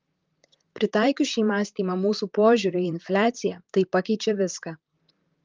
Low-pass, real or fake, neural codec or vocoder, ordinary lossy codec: 7.2 kHz; fake; vocoder, 44.1 kHz, 128 mel bands, Pupu-Vocoder; Opus, 24 kbps